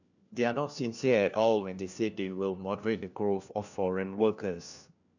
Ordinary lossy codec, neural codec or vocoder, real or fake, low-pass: none; codec, 16 kHz, 1 kbps, FunCodec, trained on LibriTTS, 50 frames a second; fake; 7.2 kHz